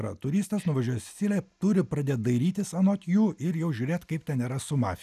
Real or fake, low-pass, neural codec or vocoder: real; 14.4 kHz; none